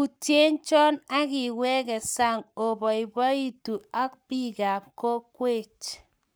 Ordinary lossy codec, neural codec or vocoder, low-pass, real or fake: none; codec, 44.1 kHz, 7.8 kbps, Pupu-Codec; none; fake